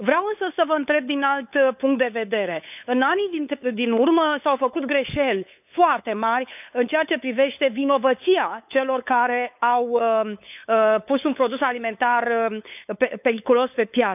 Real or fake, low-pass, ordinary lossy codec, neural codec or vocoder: fake; 3.6 kHz; none; codec, 16 kHz, 8 kbps, FunCodec, trained on LibriTTS, 25 frames a second